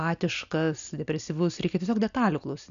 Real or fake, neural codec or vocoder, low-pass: real; none; 7.2 kHz